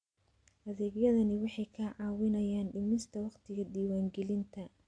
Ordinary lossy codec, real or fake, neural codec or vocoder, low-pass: AAC, 64 kbps; real; none; 9.9 kHz